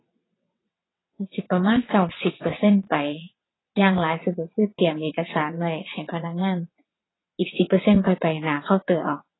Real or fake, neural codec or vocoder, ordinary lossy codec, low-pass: fake; vocoder, 22.05 kHz, 80 mel bands, WaveNeXt; AAC, 16 kbps; 7.2 kHz